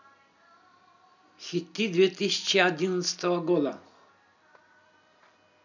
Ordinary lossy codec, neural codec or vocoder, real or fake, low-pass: none; none; real; 7.2 kHz